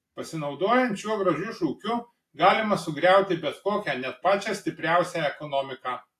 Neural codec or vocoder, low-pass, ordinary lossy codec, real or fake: none; 14.4 kHz; AAC, 64 kbps; real